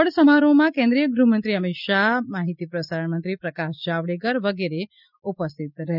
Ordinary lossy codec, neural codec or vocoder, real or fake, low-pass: none; none; real; 5.4 kHz